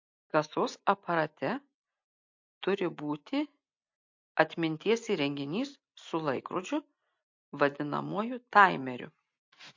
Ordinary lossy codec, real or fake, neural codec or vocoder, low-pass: MP3, 48 kbps; real; none; 7.2 kHz